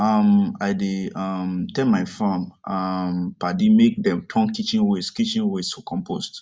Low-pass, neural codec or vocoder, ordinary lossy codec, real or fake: none; none; none; real